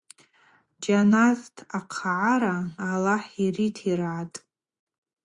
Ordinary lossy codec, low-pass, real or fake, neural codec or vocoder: Opus, 64 kbps; 10.8 kHz; fake; vocoder, 24 kHz, 100 mel bands, Vocos